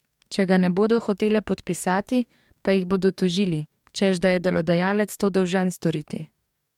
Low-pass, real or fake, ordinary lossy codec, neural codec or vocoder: 19.8 kHz; fake; MP3, 96 kbps; codec, 44.1 kHz, 2.6 kbps, DAC